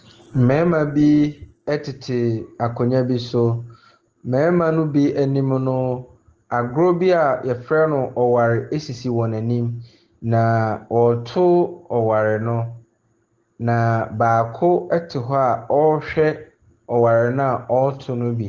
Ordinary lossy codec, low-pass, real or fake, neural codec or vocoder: Opus, 16 kbps; 7.2 kHz; real; none